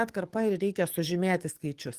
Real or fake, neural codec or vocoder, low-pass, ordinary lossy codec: fake; codec, 44.1 kHz, 7.8 kbps, DAC; 14.4 kHz; Opus, 24 kbps